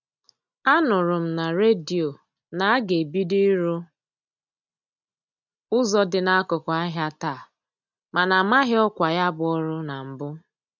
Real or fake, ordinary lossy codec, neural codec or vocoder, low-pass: real; none; none; 7.2 kHz